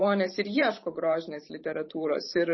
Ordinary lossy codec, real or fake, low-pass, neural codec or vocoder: MP3, 24 kbps; fake; 7.2 kHz; vocoder, 44.1 kHz, 128 mel bands every 512 samples, BigVGAN v2